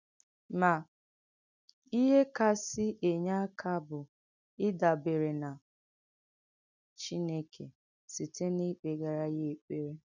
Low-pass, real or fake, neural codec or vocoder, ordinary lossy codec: 7.2 kHz; real; none; none